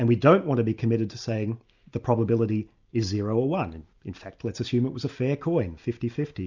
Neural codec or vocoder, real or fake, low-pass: none; real; 7.2 kHz